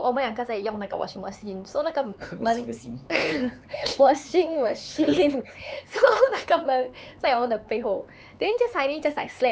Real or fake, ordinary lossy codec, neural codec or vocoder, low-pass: fake; none; codec, 16 kHz, 4 kbps, X-Codec, HuBERT features, trained on LibriSpeech; none